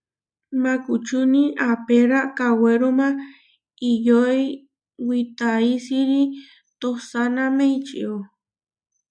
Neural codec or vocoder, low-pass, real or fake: none; 9.9 kHz; real